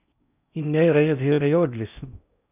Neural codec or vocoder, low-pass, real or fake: codec, 16 kHz in and 24 kHz out, 0.6 kbps, FocalCodec, streaming, 4096 codes; 3.6 kHz; fake